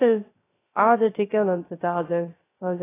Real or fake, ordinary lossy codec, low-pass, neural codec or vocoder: fake; AAC, 16 kbps; 3.6 kHz; codec, 16 kHz, 0.3 kbps, FocalCodec